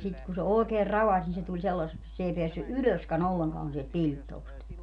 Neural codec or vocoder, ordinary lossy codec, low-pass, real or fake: none; none; 9.9 kHz; real